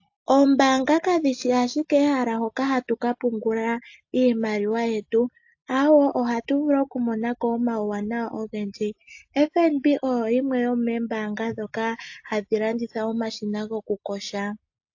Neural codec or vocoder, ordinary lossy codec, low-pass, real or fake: none; AAC, 48 kbps; 7.2 kHz; real